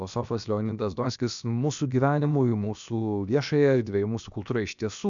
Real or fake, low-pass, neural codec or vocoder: fake; 7.2 kHz; codec, 16 kHz, about 1 kbps, DyCAST, with the encoder's durations